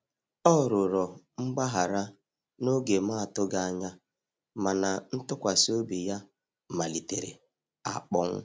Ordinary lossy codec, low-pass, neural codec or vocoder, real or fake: none; none; none; real